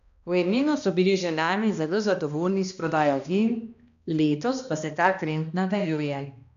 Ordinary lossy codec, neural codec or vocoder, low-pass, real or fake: none; codec, 16 kHz, 1 kbps, X-Codec, HuBERT features, trained on balanced general audio; 7.2 kHz; fake